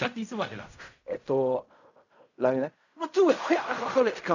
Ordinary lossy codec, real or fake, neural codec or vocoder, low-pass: none; fake; codec, 16 kHz in and 24 kHz out, 0.4 kbps, LongCat-Audio-Codec, fine tuned four codebook decoder; 7.2 kHz